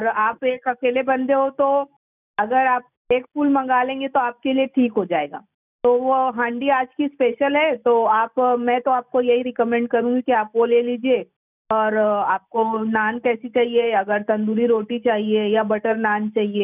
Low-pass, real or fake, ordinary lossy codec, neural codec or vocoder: 3.6 kHz; real; none; none